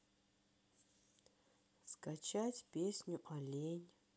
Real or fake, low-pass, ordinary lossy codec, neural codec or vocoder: real; none; none; none